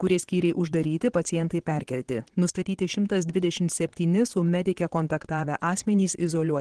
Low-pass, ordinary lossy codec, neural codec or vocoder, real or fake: 9.9 kHz; Opus, 16 kbps; vocoder, 22.05 kHz, 80 mel bands, Vocos; fake